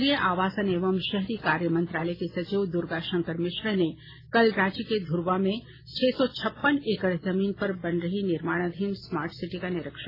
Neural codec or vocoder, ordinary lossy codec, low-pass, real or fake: none; AAC, 24 kbps; 5.4 kHz; real